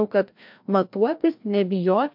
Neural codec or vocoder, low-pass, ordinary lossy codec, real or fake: codec, 16 kHz, 1 kbps, FreqCodec, larger model; 5.4 kHz; MP3, 48 kbps; fake